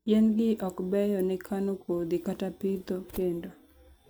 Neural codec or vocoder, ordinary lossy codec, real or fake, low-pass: none; none; real; none